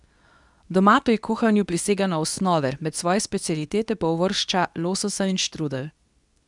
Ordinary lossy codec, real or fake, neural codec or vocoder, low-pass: none; fake; codec, 24 kHz, 0.9 kbps, WavTokenizer, medium speech release version 2; 10.8 kHz